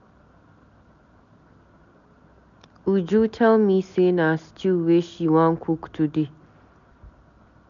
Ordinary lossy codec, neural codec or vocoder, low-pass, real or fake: none; none; 7.2 kHz; real